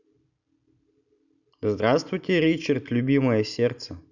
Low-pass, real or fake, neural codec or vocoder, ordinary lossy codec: 7.2 kHz; real; none; none